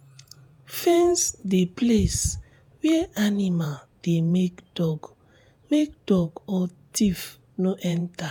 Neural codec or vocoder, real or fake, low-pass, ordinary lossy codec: vocoder, 48 kHz, 128 mel bands, Vocos; fake; 19.8 kHz; none